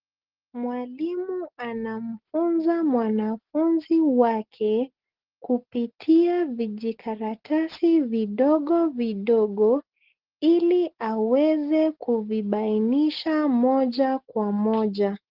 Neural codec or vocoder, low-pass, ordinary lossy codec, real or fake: none; 5.4 kHz; Opus, 16 kbps; real